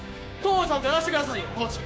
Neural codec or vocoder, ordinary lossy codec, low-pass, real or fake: codec, 16 kHz, 6 kbps, DAC; none; none; fake